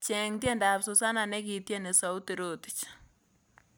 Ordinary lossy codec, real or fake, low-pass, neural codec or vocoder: none; real; none; none